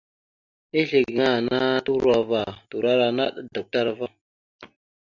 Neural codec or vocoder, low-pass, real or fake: none; 7.2 kHz; real